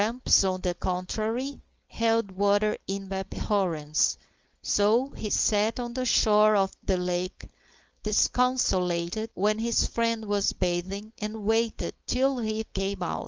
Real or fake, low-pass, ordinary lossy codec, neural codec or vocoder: fake; 7.2 kHz; Opus, 24 kbps; codec, 16 kHz, 4.8 kbps, FACodec